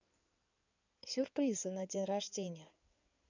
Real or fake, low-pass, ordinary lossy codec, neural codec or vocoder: fake; 7.2 kHz; none; codec, 16 kHz in and 24 kHz out, 2.2 kbps, FireRedTTS-2 codec